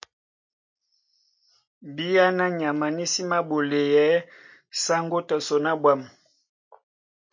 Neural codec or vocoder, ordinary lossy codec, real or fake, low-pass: none; MP3, 48 kbps; real; 7.2 kHz